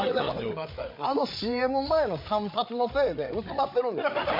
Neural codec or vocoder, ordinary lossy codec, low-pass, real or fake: codec, 16 kHz, 8 kbps, FreqCodec, larger model; MP3, 32 kbps; 5.4 kHz; fake